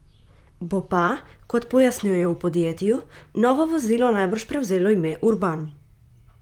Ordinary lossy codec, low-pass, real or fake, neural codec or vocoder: Opus, 32 kbps; 19.8 kHz; fake; vocoder, 44.1 kHz, 128 mel bands, Pupu-Vocoder